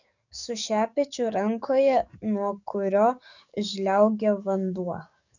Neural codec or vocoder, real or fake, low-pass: codec, 44.1 kHz, 7.8 kbps, DAC; fake; 7.2 kHz